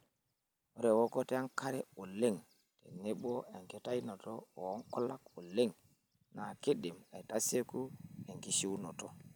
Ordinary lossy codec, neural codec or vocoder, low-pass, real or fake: none; none; none; real